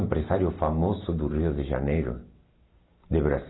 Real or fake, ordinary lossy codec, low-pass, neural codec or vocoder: real; AAC, 16 kbps; 7.2 kHz; none